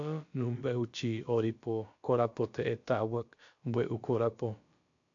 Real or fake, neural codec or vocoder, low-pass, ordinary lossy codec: fake; codec, 16 kHz, about 1 kbps, DyCAST, with the encoder's durations; 7.2 kHz; AAC, 64 kbps